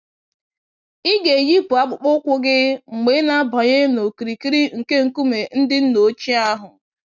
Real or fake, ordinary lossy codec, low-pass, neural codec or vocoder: real; none; 7.2 kHz; none